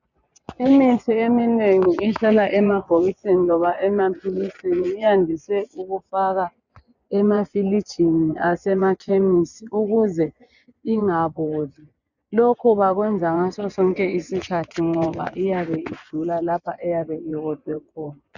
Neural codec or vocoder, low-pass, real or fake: vocoder, 24 kHz, 100 mel bands, Vocos; 7.2 kHz; fake